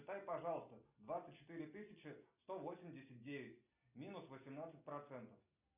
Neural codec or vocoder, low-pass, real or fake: none; 3.6 kHz; real